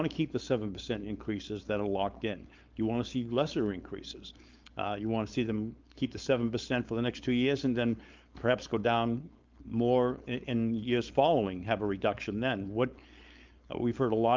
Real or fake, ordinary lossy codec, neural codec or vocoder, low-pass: fake; Opus, 24 kbps; codec, 16 kHz, 4.8 kbps, FACodec; 7.2 kHz